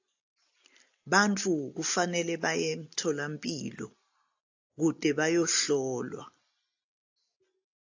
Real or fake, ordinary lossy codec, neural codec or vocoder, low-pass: real; AAC, 48 kbps; none; 7.2 kHz